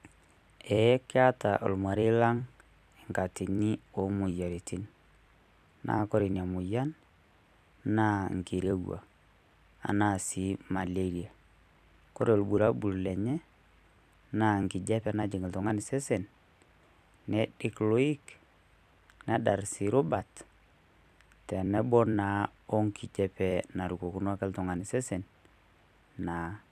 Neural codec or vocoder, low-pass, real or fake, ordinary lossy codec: vocoder, 44.1 kHz, 128 mel bands every 256 samples, BigVGAN v2; 14.4 kHz; fake; none